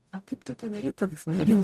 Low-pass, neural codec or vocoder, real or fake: 14.4 kHz; codec, 44.1 kHz, 0.9 kbps, DAC; fake